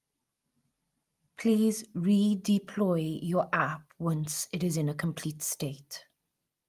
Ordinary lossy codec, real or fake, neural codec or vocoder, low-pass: Opus, 32 kbps; real; none; 14.4 kHz